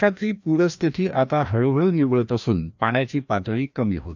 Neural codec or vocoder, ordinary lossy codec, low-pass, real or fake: codec, 16 kHz, 1 kbps, FreqCodec, larger model; none; 7.2 kHz; fake